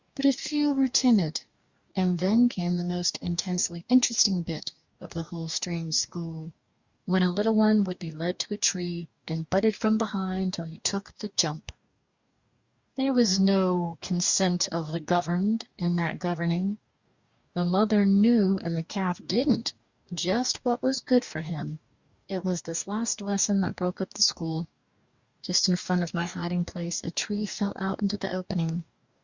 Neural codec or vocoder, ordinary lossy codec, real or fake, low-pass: codec, 44.1 kHz, 2.6 kbps, DAC; Opus, 64 kbps; fake; 7.2 kHz